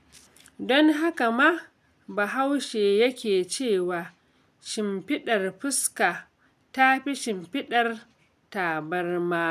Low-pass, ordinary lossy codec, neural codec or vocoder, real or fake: 14.4 kHz; none; none; real